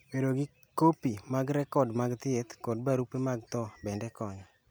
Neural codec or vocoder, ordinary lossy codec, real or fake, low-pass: none; none; real; none